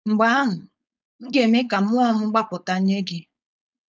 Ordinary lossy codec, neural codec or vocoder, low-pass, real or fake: none; codec, 16 kHz, 4.8 kbps, FACodec; none; fake